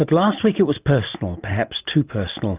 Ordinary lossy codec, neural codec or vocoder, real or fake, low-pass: Opus, 24 kbps; none; real; 3.6 kHz